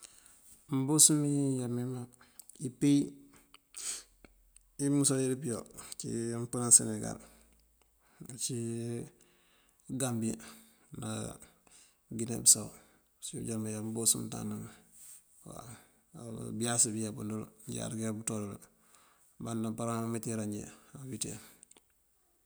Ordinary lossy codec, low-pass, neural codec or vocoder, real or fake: none; none; none; real